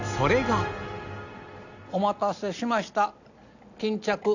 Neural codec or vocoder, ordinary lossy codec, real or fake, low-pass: none; none; real; 7.2 kHz